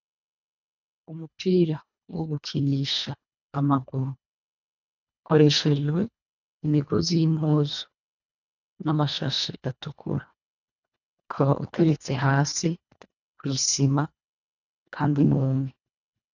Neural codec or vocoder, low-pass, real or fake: codec, 24 kHz, 1.5 kbps, HILCodec; 7.2 kHz; fake